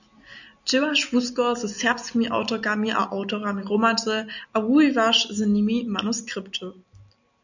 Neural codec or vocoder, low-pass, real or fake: none; 7.2 kHz; real